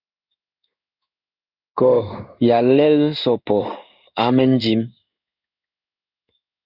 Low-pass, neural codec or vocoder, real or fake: 5.4 kHz; codec, 16 kHz in and 24 kHz out, 1 kbps, XY-Tokenizer; fake